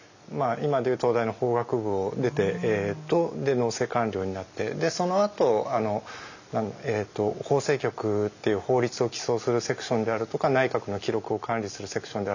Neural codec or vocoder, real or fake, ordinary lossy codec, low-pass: none; real; none; 7.2 kHz